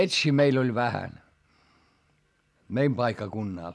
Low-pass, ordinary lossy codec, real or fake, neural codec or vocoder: none; none; real; none